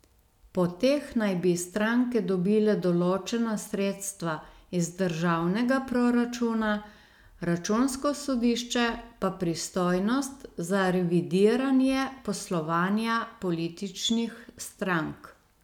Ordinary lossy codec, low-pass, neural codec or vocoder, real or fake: none; 19.8 kHz; none; real